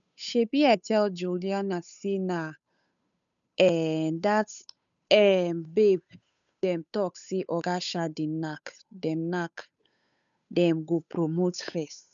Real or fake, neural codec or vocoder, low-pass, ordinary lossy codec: fake; codec, 16 kHz, 8 kbps, FunCodec, trained on Chinese and English, 25 frames a second; 7.2 kHz; none